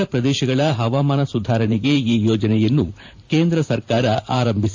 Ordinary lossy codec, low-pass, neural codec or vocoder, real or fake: AAC, 48 kbps; 7.2 kHz; vocoder, 44.1 kHz, 128 mel bands every 512 samples, BigVGAN v2; fake